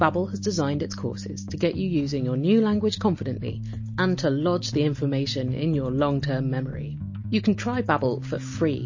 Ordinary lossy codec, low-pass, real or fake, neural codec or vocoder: MP3, 32 kbps; 7.2 kHz; real; none